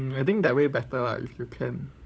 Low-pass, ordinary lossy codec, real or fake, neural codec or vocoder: none; none; fake; codec, 16 kHz, 16 kbps, FunCodec, trained on LibriTTS, 50 frames a second